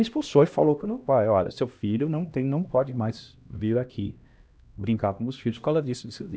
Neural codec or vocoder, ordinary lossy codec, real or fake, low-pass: codec, 16 kHz, 1 kbps, X-Codec, HuBERT features, trained on LibriSpeech; none; fake; none